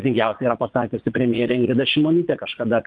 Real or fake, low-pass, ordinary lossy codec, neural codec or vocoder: fake; 9.9 kHz; Opus, 32 kbps; vocoder, 22.05 kHz, 80 mel bands, Vocos